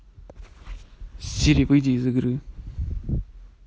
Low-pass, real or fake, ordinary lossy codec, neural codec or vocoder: none; real; none; none